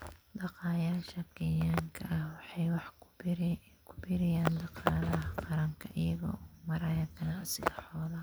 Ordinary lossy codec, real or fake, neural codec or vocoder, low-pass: none; real; none; none